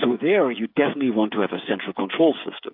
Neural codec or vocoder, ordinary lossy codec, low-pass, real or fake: codec, 16 kHz, 8 kbps, FreqCodec, smaller model; MP3, 48 kbps; 5.4 kHz; fake